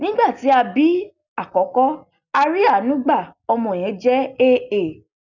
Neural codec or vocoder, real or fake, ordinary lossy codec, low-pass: none; real; none; 7.2 kHz